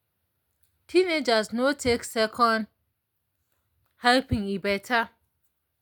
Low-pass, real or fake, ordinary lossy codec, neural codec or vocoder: none; real; none; none